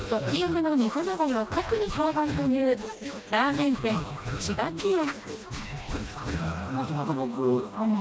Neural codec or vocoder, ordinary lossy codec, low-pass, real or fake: codec, 16 kHz, 1 kbps, FreqCodec, smaller model; none; none; fake